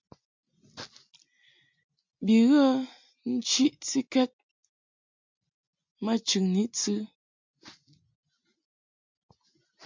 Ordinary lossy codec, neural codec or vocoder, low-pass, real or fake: MP3, 64 kbps; none; 7.2 kHz; real